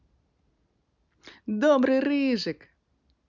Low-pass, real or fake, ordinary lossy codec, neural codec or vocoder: 7.2 kHz; real; none; none